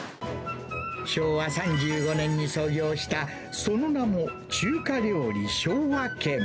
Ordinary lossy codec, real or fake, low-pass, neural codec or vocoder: none; real; none; none